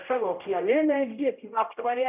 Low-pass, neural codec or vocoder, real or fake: 3.6 kHz; codec, 16 kHz, 0.5 kbps, X-Codec, HuBERT features, trained on general audio; fake